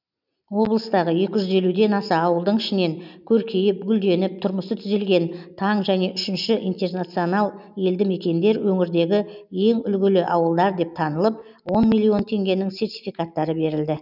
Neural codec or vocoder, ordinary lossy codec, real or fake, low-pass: none; none; real; 5.4 kHz